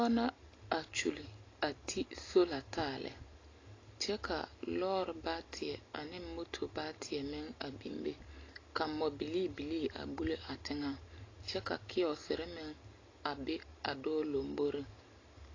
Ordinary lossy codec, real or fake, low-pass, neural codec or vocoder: AAC, 32 kbps; real; 7.2 kHz; none